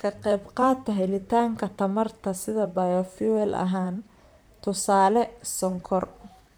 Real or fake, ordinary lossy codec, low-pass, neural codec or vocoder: fake; none; none; vocoder, 44.1 kHz, 128 mel bands, Pupu-Vocoder